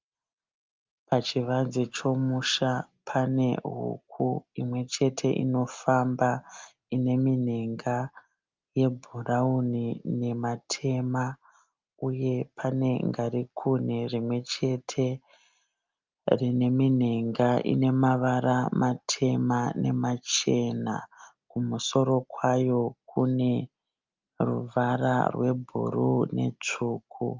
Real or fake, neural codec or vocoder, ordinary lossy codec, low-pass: real; none; Opus, 24 kbps; 7.2 kHz